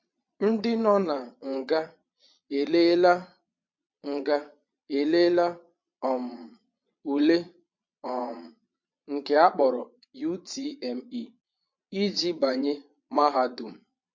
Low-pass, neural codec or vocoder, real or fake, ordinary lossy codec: 7.2 kHz; vocoder, 22.05 kHz, 80 mel bands, Vocos; fake; MP3, 48 kbps